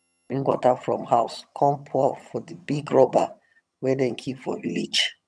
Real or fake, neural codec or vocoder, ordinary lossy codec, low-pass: fake; vocoder, 22.05 kHz, 80 mel bands, HiFi-GAN; none; none